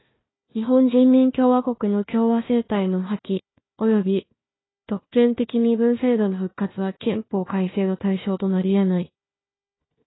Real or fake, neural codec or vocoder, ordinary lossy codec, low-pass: fake; codec, 16 kHz, 1 kbps, FunCodec, trained on Chinese and English, 50 frames a second; AAC, 16 kbps; 7.2 kHz